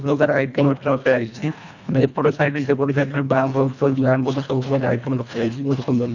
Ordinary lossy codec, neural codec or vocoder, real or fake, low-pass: none; codec, 24 kHz, 1.5 kbps, HILCodec; fake; 7.2 kHz